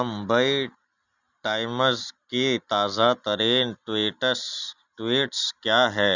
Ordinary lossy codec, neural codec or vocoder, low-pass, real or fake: none; none; 7.2 kHz; real